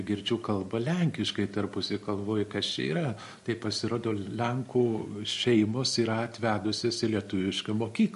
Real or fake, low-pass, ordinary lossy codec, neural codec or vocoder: real; 14.4 kHz; MP3, 48 kbps; none